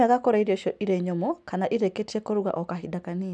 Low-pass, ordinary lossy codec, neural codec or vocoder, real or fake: none; none; none; real